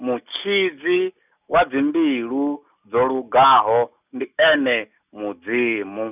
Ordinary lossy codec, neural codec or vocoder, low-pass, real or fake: none; none; 3.6 kHz; real